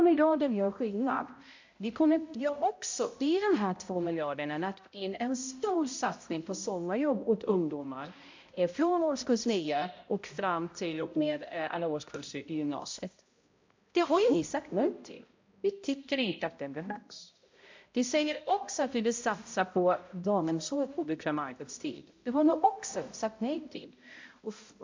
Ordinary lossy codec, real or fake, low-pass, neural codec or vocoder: MP3, 48 kbps; fake; 7.2 kHz; codec, 16 kHz, 0.5 kbps, X-Codec, HuBERT features, trained on balanced general audio